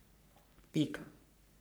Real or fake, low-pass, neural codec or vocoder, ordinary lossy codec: fake; none; codec, 44.1 kHz, 3.4 kbps, Pupu-Codec; none